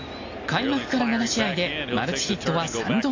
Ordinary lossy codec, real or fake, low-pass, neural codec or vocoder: AAC, 32 kbps; real; 7.2 kHz; none